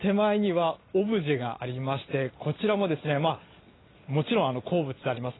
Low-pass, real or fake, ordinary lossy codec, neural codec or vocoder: 7.2 kHz; real; AAC, 16 kbps; none